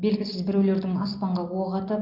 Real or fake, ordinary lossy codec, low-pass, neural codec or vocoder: real; Opus, 24 kbps; 5.4 kHz; none